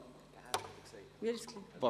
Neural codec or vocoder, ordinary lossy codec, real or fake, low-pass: vocoder, 44.1 kHz, 128 mel bands every 512 samples, BigVGAN v2; none; fake; 14.4 kHz